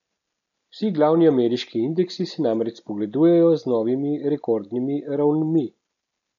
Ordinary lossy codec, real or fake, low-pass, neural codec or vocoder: none; real; 7.2 kHz; none